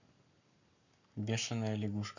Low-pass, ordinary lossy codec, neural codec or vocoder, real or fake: 7.2 kHz; none; codec, 44.1 kHz, 7.8 kbps, Pupu-Codec; fake